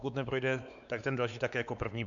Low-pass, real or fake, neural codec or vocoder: 7.2 kHz; fake; codec, 16 kHz, 4 kbps, X-Codec, WavLM features, trained on Multilingual LibriSpeech